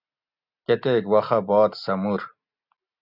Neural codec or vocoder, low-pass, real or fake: none; 5.4 kHz; real